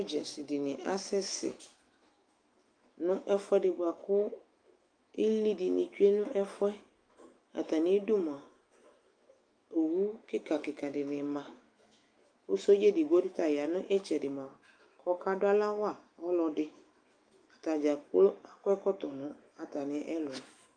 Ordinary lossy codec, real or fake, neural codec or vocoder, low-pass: Opus, 32 kbps; fake; autoencoder, 48 kHz, 128 numbers a frame, DAC-VAE, trained on Japanese speech; 9.9 kHz